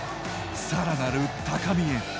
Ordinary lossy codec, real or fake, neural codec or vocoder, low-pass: none; real; none; none